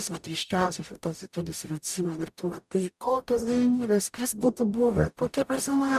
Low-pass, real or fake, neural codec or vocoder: 14.4 kHz; fake; codec, 44.1 kHz, 0.9 kbps, DAC